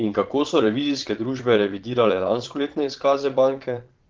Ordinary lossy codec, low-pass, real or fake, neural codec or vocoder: Opus, 16 kbps; 7.2 kHz; fake; vocoder, 22.05 kHz, 80 mel bands, Vocos